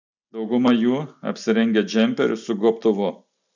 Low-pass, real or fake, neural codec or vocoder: 7.2 kHz; real; none